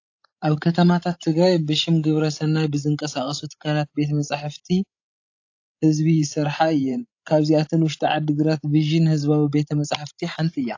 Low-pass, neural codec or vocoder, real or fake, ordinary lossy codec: 7.2 kHz; codec, 16 kHz, 16 kbps, FreqCodec, larger model; fake; AAC, 48 kbps